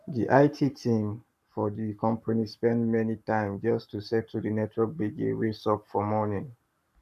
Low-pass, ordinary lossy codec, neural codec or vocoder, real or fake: 14.4 kHz; none; codec, 44.1 kHz, 7.8 kbps, Pupu-Codec; fake